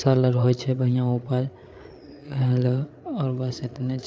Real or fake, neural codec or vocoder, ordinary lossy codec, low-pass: fake; codec, 16 kHz, 8 kbps, FreqCodec, larger model; none; none